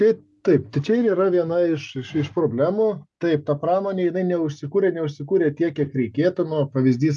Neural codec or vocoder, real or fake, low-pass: none; real; 10.8 kHz